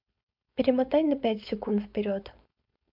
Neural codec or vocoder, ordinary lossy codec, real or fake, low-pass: codec, 16 kHz, 4.8 kbps, FACodec; MP3, 48 kbps; fake; 5.4 kHz